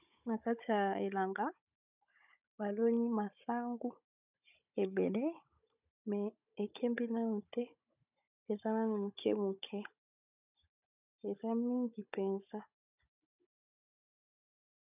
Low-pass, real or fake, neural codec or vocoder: 3.6 kHz; fake; codec, 16 kHz, 16 kbps, FunCodec, trained on Chinese and English, 50 frames a second